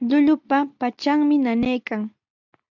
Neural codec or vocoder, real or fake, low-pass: none; real; 7.2 kHz